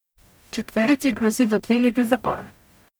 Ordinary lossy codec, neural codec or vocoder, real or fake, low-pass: none; codec, 44.1 kHz, 0.9 kbps, DAC; fake; none